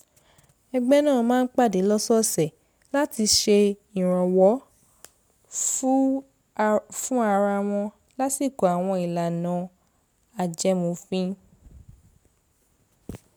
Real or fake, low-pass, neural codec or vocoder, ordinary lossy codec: real; none; none; none